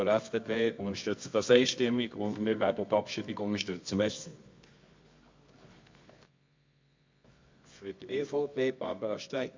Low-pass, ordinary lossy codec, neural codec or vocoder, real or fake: 7.2 kHz; MP3, 48 kbps; codec, 24 kHz, 0.9 kbps, WavTokenizer, medium music audio release; fake